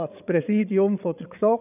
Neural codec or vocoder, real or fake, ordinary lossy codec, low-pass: codec, 16 kHz, 8 kbps, FreqCodec, larger model; fake; none; 3.6 kHz